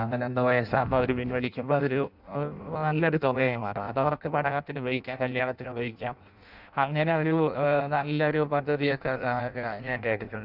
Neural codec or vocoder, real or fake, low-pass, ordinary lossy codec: codec, 16 kHz in and 24 kHz out, 0.6 kbps, FireRedTTS-2 codec; fake; 5.4 kHz; none